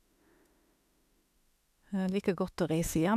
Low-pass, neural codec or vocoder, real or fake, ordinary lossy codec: 14.4 kHz; autoencoder, 48 kHz, 32 numbers a frame, DAC-VAE, trained on Japanese speech; fake; none